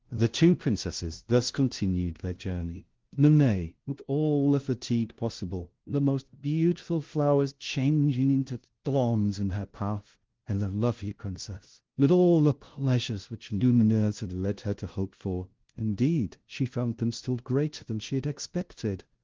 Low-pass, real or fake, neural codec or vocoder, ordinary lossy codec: 7.2 kHz; fake; codec, 16 kHz, 0.5 kbps, FunCodec, trained on LibriTTS, 25 frames a second; Opus, 16 kbps